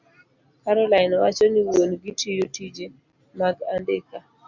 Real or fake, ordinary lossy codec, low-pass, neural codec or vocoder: real; Opus, 64 kbps; 7.2 kHz; none